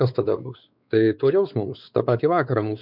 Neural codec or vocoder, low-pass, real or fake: codec, 16 kHz, 4 kbps, X-Codec, WavLM features, trained on Multilingual LibriSpeech; 5.4 kHz; fake